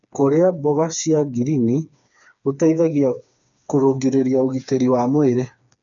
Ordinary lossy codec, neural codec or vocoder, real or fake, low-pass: none; codec, 16 kHz, 4 kbps, FreqCodec, smaller model; fake; 7.2 kHz